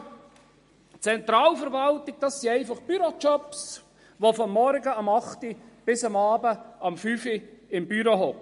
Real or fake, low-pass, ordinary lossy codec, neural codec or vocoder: real; 10.8 kHz; MP3, 48 kbps; none